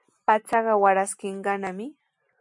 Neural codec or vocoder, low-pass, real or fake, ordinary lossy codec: none; 10.8 kHz; real; MP3, 64 kbps